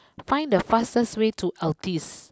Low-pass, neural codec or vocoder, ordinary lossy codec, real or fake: none; none; none; real